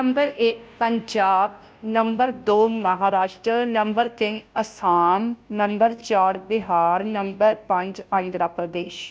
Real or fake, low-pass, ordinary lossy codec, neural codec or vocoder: fake; none; none; codec, 16 kHz, 0.5 kbps, FunCodec, trained on Chinese and English, 25 frames a second